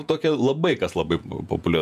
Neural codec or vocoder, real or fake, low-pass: vocoder, 48 kHz, 128 mel bands, Vocos; fake; 14.4 kHz